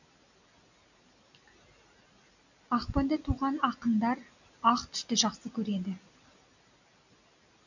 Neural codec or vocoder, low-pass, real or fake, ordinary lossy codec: none; 7.2 kHz; real; none